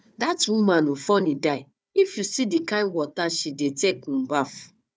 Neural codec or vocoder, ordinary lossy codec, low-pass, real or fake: codec, 16 kHz, 4 kbps, FunCodec, trained on Chinese and English, 50 frames a second; none; none; fake